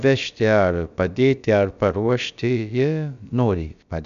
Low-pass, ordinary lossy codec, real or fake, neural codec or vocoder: 7.2 kHz; MP3, 96 kbps; fake; codec, 16 kHz, about 1 kbps, DyCAST, with the encoder's durations